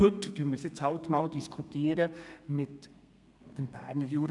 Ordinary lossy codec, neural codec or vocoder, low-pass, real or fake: none; codec, 32 kHz, 1.9 kbps, SNAC; 10.8 kHz; fake